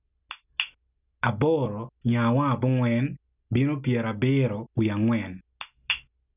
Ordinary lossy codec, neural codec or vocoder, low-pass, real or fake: none; none; 3.6 kHz; real